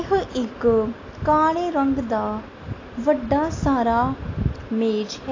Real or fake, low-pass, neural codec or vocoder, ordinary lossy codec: real; 7.2 kHz; none; AAC, 32 kbps